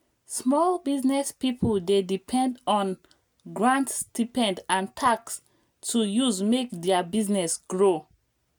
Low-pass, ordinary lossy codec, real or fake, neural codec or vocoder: none; none; real; none